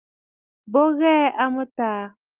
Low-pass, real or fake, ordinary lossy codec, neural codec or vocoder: 3.6 kHz; real; Opus, 32 kbps; none